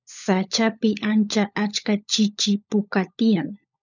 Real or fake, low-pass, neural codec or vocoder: fake; 7.2 kHz; codec, 16 kHz, 16 kbps, FunCodec, trained on LibriTTS, 50 frames a second